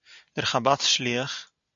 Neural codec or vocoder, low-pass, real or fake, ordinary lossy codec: none; 7.2 kHz; real; AAC, 64 kbps